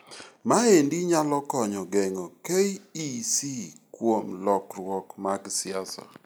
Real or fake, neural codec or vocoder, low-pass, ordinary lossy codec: real; none; none; none